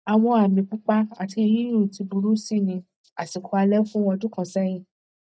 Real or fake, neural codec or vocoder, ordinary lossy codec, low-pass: real; none; none; none